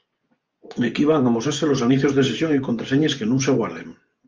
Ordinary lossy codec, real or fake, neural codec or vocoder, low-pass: Opus, 32 kbps; fake; vocoder, 24 kHz, 100 mel bands, Vocos; 7.2 kHz